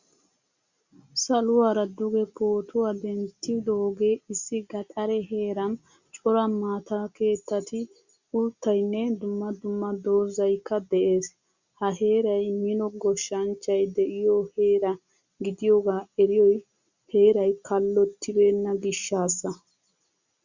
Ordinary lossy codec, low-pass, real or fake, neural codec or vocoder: Opus, 64 kbps; 7.2 kHz; real; none